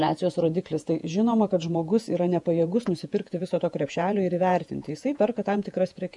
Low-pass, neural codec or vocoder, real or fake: 10.8 kHz; vocoder, 48 kHz, 128 mel bands, Vocos; fake